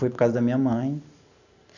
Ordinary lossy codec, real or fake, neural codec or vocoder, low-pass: none; real; none; 7.2 kHz